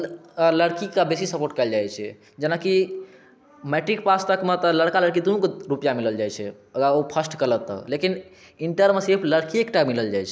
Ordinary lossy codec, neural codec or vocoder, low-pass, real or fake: none; none; none; real